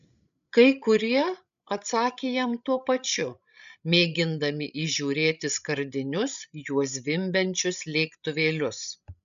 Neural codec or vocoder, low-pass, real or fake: codec, 16 kHz, 16 kbps, FreqCodec, larger model; 7.2 kHz; fake